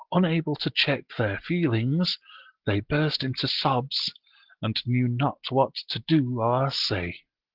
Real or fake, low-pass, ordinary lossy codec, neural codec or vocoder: real; 5.4 kHz; Opus, 16 kbps; none